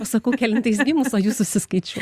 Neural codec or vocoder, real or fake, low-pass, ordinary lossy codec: none; real; 14.4 kHz; Opus, 64 kbps